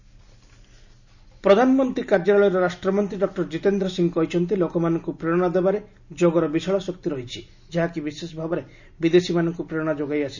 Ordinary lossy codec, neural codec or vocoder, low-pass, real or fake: none; none; 7.2 kHz; real